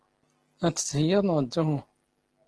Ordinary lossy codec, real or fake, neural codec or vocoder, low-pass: Opus, 16 kbps; real; none; 10.8 kHz